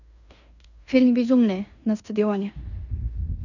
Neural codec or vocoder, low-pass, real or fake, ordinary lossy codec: codec, 16 kHz in and 24 kHz out, 0.9 kbps, LongCat-Audio-Codec, fine tuned four codebook decoder; 7.2 kHz; fake; none